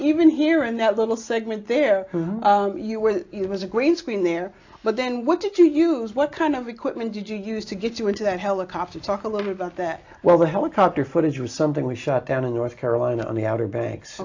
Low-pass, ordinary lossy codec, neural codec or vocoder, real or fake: 7.2 kHz; AAC, 48 kbps; none; real